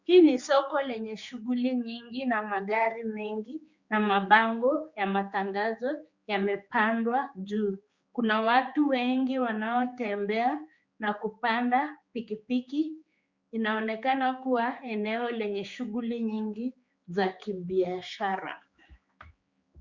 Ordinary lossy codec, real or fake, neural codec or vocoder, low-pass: Opus, 64 kbps; fake; codec, 16 kHz, 4 kbps, X-Codec, HuBERT features, trained on general audio; 7.2 kHz